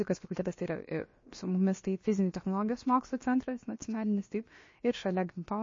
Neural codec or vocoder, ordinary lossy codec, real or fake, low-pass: codec, 16 kHz, about 1 kbps, DyCAST, with the encoder's durations; MP3, 32 kbps; fake; 7.2 kHz